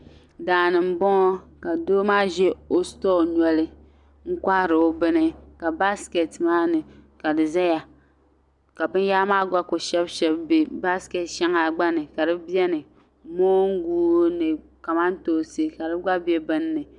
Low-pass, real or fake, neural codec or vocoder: 10.8 kHz; real; none